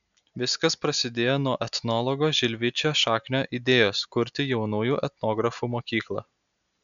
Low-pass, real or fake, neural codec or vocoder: 7.2 kHz; real; none